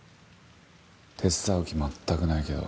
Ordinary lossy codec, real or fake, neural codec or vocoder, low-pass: none; real; none; none